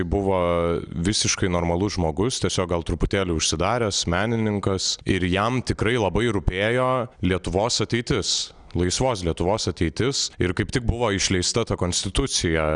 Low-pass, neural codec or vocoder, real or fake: 9.9 kHz; none; real